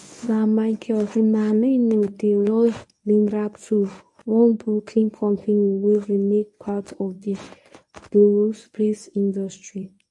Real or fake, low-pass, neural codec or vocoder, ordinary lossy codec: fake; 10.8 kHz; codec, 24 kHz, 0.9 kbps, WavTokenizer, medium speech release version 1; AAC, 64 kbps